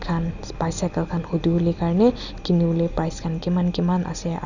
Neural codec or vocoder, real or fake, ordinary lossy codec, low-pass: none; real; none; 7.2 kHz